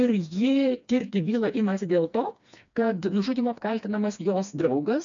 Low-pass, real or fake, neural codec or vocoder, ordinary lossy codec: 7.2 kHz; fake; codec, 16 kHz, 2 kbps, FreqCodec, smaller model; MP3, 64 kbps